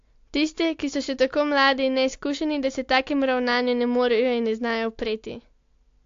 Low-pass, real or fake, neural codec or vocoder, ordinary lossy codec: 7.2 kHz; real; none; AAC, 48 kbps